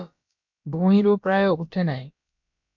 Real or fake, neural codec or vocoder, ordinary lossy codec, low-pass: fake; codec, 16 kHz, about 1 kbps, DyCAST, with the encoder's durations; MP3, 64 kbps; 7.2 kHz